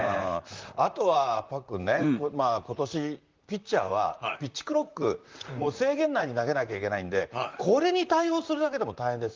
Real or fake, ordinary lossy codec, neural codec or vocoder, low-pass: fake; Opus, 24 kbps; vocoder, 44.1 kHz, 128 mel bands, Pupu-Vocoder; 7.2 kHz